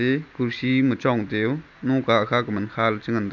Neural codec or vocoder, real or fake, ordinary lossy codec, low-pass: none; real; none; 7.2 kHz